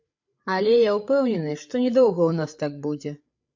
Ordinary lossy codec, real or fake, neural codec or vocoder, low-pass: MP3, 48 kbps; fake; codec, 16 kHz, 8 kbps, FreqCodec, larger model; 7.2 kHz